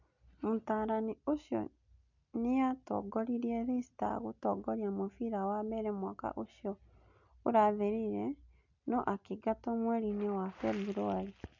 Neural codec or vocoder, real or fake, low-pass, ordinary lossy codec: none; real; 7.2 kHz; none